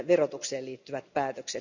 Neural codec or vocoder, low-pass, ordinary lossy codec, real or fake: none; 7.2 kHz; none; real